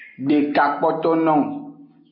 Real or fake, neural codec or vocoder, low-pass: real; none; 5.4 kHz